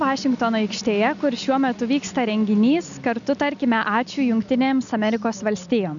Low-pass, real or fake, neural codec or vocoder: 7.2 kHz; real; none